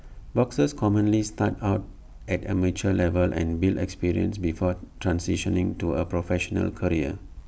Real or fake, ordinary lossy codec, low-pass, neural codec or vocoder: real; none; none; none